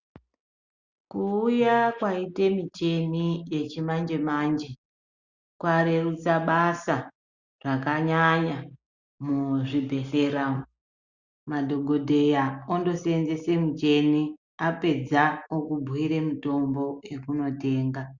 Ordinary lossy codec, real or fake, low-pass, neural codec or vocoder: Opus, 64 kbps; real; 7.2 kHz; none